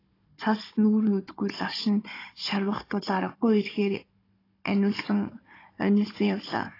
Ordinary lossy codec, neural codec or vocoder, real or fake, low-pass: AAC, 24 kbps; codec, 16 kHz, 16 kbps, FunCodec, trained on Chinese and English, 50 frames a second; fake; 5.4 kHz